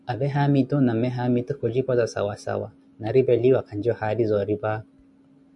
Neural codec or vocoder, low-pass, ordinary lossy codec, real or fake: none; 10.8 kHz; MP3, 96 kbps; real